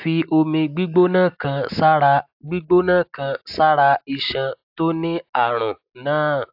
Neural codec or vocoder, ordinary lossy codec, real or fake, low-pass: none; AAC, 48 kbps; real; 5.4 kHz